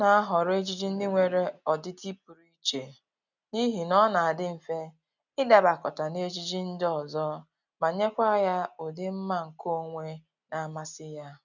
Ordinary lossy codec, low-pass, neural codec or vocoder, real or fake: none; 7.2 kHz; none; real